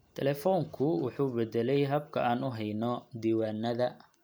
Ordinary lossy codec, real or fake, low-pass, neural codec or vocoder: none; real; none; none